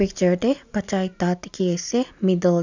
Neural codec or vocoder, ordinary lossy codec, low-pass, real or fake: none; none; 7.2 kHz; real